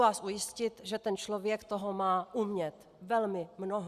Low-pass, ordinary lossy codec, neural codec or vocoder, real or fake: 14.4 kHz; Opus, 64 kbps; none; real